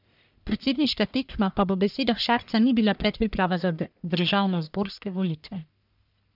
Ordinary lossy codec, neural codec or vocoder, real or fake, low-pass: none; codec, 44.1 kHz, 1.7 kbps, Pupu-Codec; fake; 5.4 kHz